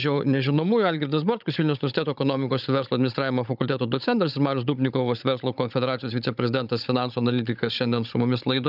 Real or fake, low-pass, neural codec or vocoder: fake; 5.4 kHz; codec, 16 kHz, 16 kbps, FunCodec, trained on Chinese and English, 50 frames a second